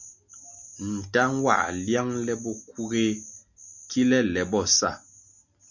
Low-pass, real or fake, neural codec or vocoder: 7.2 kHz; real; none